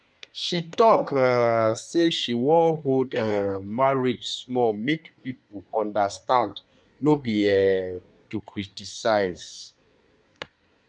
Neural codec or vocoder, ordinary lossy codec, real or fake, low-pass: codec, 24 kHz, 1 kbps, SNAC; none; fake; 9.9 kHz